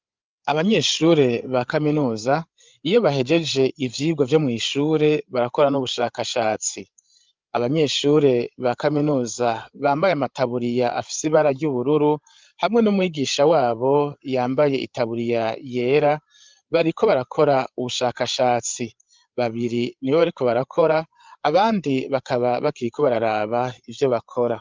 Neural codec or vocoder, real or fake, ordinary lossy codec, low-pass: codec, 16 kHz, 8 kbps, FreqCodec, larger model; fake; Opus, 24 kbps; 7.2 kHz